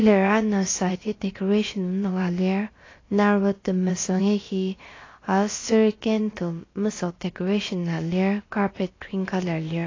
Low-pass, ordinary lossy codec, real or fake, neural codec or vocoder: 7.2 kHz; AAC, 32 kbps; fake; codec, 16 kHz, about 1 kbps, DyCAST, with the encoder's durations